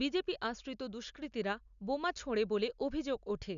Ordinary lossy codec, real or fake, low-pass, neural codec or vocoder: none; real; 7.2 kHz; none